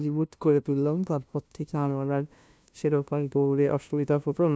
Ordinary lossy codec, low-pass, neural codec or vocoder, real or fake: none; none; codec, 16 kHz, 0.5 kbps, FunCodec, trained on LibriTTS, 25 frames a second; fake